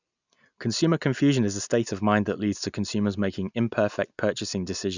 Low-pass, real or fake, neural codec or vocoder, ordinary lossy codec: 7.2 kHz; real; none; none